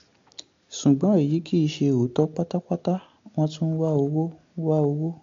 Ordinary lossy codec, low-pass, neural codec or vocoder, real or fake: AAC, 48 kbps; 7.2 kHz; none; real